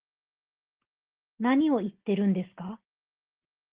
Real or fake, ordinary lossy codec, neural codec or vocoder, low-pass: real; Opus, 16 kbps; none; 3.6 kHz